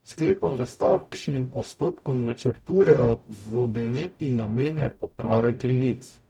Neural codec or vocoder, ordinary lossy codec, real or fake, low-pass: codec, 44.1 kHz, 0.9 kbps, DAC; none; fake; 19.8 kHz